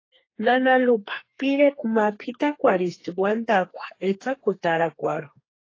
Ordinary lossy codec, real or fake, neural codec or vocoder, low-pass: AAC, 32 kbps; fake; codec, 32 kHz, 1.9 kbps, SNAC; 7.2 kHz